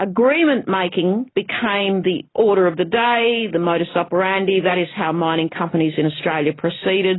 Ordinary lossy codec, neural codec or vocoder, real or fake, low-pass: AAC, 16 kbps; none; real; 7.2 kHz